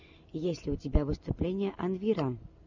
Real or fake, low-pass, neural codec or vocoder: real; 7.2 kHz; none